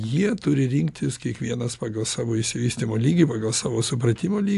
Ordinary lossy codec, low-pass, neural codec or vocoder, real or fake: AAC, 64 kbps; 10.8 kHz; none; real